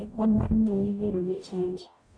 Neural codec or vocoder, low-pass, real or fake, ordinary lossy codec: codec, 44.1 kHz, 0.9 kbps, DAC; 9.9 kHz; fake; none